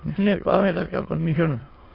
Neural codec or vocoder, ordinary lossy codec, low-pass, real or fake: autoencoder, 22.05 kHz, a latent of 192 numbers a frame, VITS, trained on many speakers; AAC, 24 kbps; 5.4 kHz; fake